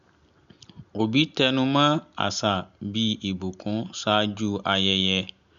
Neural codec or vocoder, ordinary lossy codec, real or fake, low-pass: none; none; real; 7.2 kHz